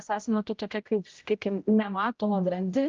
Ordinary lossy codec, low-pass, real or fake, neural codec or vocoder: Opus, 24 kbps; 7.2 kHz; fake; codec, 16 kHz, 0.5 kbps, X-Codec, HuBERT features, trained on general audio